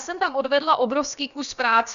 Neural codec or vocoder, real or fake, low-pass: codec, 16 kHz, about 1 kbps, DyCAST, with the encoder's durations; fake; 7.2 kHz